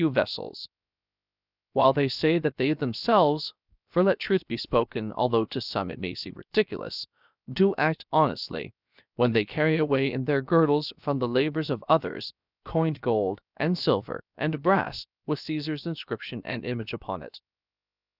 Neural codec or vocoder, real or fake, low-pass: codec, 16 kHz, about 1 kbps, DyCAST, with the encoder's durations; fake; 5.4 kHz